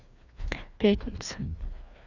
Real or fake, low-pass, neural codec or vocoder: fake; 7.2 kHz; codec, 16 kHz, 2 kbps, FreqCodec, larger model